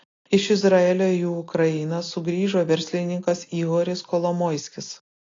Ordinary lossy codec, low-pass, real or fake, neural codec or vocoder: AAC, 32 kbps; 7.2 kHz; real; none